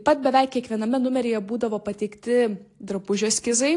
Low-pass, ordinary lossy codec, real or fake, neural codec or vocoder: 10.8 kHz; AAC, 48 kbps; real; none